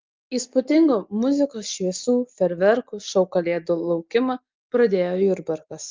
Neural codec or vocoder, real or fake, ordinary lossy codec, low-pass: none; real; Opus, 24 kbps; 7.2 kHz